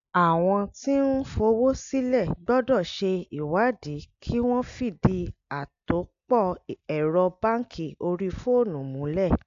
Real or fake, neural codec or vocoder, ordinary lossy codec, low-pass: real; none; AAC, 64 kbps; 7.2 kHz